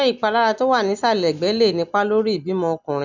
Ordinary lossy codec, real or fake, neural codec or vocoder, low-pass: none; real; none; 7.2 kHz